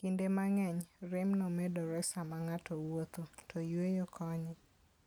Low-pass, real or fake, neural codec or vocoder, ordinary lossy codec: none; real; none; none